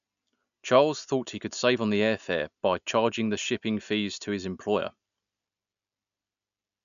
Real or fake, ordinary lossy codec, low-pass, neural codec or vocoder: real; MP3, 96 kbps; 7.2 kHz; none